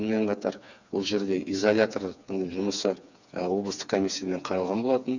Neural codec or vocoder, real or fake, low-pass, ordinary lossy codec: codec, 16 kHz, 4 kbps, FreqCodec, smaller model; fake; 7.2 kHz; none